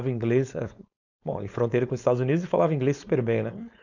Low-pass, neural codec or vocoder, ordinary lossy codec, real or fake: 7.2 kHz; codec, 16 kHz, 4.8 kbps, FACodec; none; fake